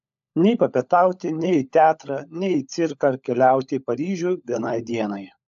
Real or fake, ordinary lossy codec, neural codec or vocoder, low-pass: fake; AAC, 96 kbps; codec, 16 kHz, 16 kbps, FunCodec, trained on LibriTTS, 50 frames a second; 7.2 kHz